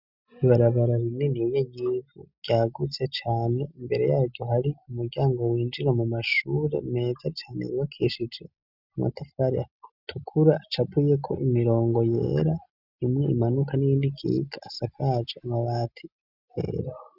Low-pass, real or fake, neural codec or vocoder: 5.4 kHz; real; none